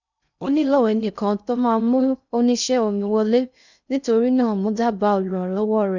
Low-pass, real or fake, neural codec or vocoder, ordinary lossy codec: 7.2 kHz; fake; codec, 16 kHz in and 24 kHz out, 0.6 kbps, FocalCodec, streaming, 2048 codes; none